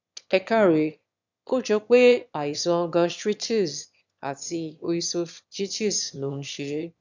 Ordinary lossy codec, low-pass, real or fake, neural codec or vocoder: none; 7.2 kHz; fake; autoencoder, 22.05 kHz, a latent of 192 numbers a frame, VITS, trained on one speaker